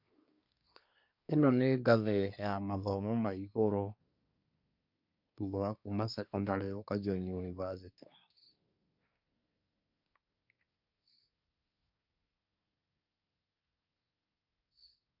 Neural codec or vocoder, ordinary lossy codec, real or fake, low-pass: codec, 24 kHz, 1 kbps, SNAC; none; fake; 5.4 kHz